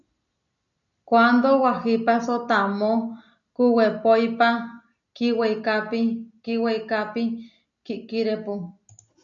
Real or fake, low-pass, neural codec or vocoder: real; 7.2 kHz; none